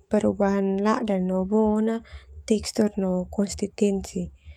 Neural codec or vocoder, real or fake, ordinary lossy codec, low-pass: codec, 44.1 kHz, 7.8 kbps, DAC; fake; none; 19.8 kHz